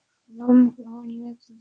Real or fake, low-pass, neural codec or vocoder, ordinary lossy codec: fake; 9.9 kHz; codec, 24 kHz, 0.9 kbps, WavTokenizer, medium speech release version 1; MP3, 64 kbps